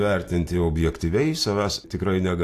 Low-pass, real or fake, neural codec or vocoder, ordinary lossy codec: 14.4 kHz; real; none; AAC, 64 kbps